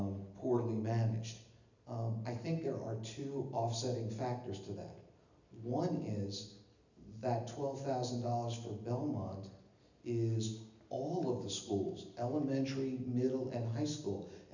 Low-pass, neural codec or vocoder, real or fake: 7.2 kHz; none; real